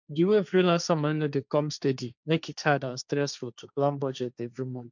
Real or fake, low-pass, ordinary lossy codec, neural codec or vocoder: fake; 7.2 kHz; none; codec, 16 kHz, 1.1 kbps, Voila-Tokenizer